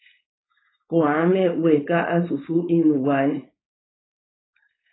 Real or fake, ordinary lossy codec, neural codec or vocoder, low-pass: fake; AAC, 16 kbps; codec, 16 kHz, 4.8 kbps, FACodec; 7.2 kHz